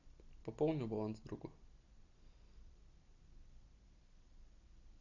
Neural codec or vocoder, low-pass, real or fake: none; 7.2 kHz; real